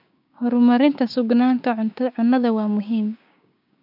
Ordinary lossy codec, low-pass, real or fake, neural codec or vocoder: none; 5.4 kHz; fake; codec, 16 kHz, 6 kbps, DAC